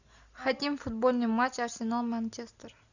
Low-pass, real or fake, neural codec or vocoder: 7.2 kHz; real; none